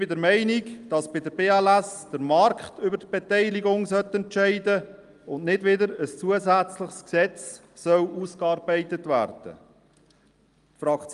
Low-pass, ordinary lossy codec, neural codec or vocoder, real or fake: 10.8 kHz; Opus, 32 kbps; none; real